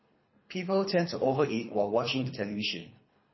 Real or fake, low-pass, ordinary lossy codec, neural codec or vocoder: fake; 7.2 kHz; MP3, 24 kbps; codec, 24 kHz, 3 kbps, HILCodec